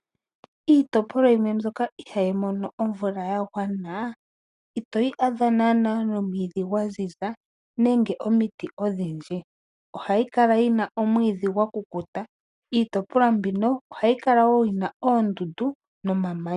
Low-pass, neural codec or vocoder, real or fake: 10.8 kHz; none; real